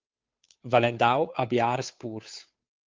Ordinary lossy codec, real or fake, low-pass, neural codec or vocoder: Opus, 24 kbps; fake; 7.2 kHz; codec, 16 kHz, 2 kbps, FunCodec, trained on Chinese and English, 25 frames a second